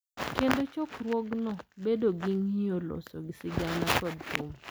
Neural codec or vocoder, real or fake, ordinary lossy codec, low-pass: none; real; none; none